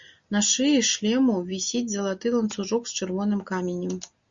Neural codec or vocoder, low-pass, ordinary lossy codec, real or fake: none; 7.2 kHz; Opus, 64 kbps; real